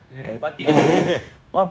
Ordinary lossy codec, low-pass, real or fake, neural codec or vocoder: none; none; fake; codec, 16 kHz, 0.9 kbps, LongCat-Audio-Codec